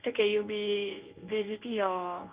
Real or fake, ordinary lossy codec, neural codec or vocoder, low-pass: fake; Opus, 24 kbps; codec, 24 kHz, 0.9 kbps, WavTokenizer, medium speech release version 1; 3.6 kHz